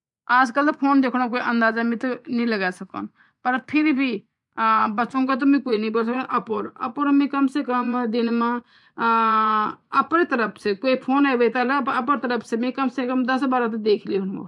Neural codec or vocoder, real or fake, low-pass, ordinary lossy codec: vocoder, 24 kHz, 100 mel bands, Vocos; fake; 10.8 kHz; MP3, 96 kbps